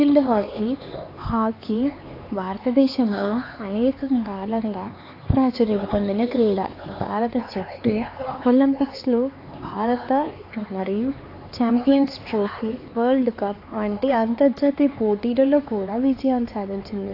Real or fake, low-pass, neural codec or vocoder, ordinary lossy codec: fake; 5.4 kHz; codec, 16 kHz, 4 kbps, X-Codec, HuBERT features, trained on LibriSpeech; AAC, 32 kbps